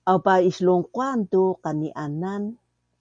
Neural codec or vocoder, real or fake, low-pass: none; real; 9.9 kHz